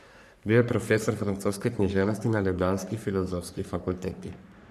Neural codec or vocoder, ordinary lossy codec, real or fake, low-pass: codec, 44.1 kHz, 3.4 kbps, Pupu-Codec; none; fake; 14.4 kHz